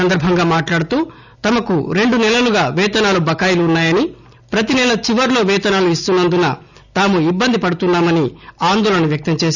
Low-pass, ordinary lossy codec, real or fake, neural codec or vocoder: 7.2 kHz; none; real; none